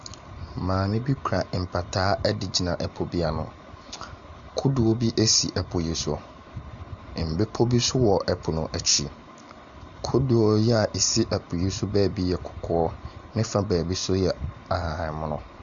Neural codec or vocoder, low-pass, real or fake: none; 7.2 kHz; real